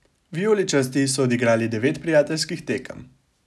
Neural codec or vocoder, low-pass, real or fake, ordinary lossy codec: none; none; real; none